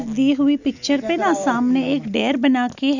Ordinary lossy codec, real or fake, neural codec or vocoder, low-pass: none; real; none; 7.2 kHz